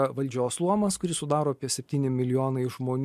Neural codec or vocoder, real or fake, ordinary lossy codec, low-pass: none; real; MP3, 64 kbps; 14.4 kHz